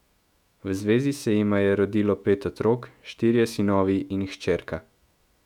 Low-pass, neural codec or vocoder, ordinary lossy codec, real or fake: 19.8 kHz; autoencoder, 48 kHz, 128 numbers a frame, DAC-VAE, trained on Japanese speech; none; fake